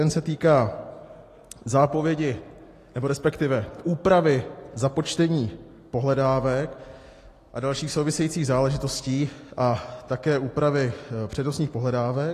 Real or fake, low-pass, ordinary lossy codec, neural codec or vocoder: real; 14.4 kHz; AAC, 48 kbps; none